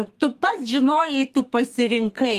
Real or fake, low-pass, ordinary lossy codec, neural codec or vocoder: fake; 14.4 kHz; Opus, 24 kbps; codec, 32 kHz, 1.9 kbps, SNAC